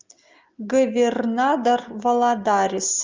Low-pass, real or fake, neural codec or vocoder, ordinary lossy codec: 7.2 kHz; real; none; Opus, 32 kbps